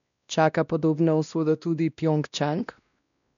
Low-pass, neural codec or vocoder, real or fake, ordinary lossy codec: 7.2 kHz; codec, 16 kHz, 1 kbps, X-Codec, WavLM features, trained on Multilingual LibriSpeech; fake; none